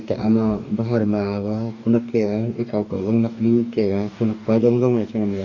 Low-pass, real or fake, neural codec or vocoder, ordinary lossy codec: 7.2 kHz; fake; codec, 44.1 kHz, 2.6 kbps, DAC; none